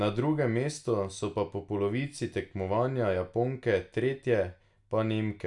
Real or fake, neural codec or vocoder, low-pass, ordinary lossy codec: real; none; 10.8 kHz; none